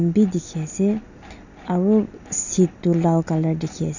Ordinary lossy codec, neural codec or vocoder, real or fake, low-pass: none; none; real; 7.2 kHz